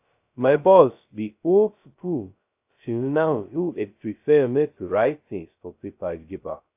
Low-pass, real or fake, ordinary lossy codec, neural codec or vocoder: 3.6 kHz; fake; AAC, 32 kbps; codec, 16 kHz, 0.2 kbps, FocalCodec